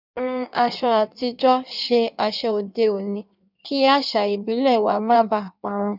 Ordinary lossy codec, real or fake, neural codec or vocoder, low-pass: none; fake; codec, 16 kHz in and 24 kHz out, 1.1 kbps, FireRedTTS-2 codec; 5.4 kHz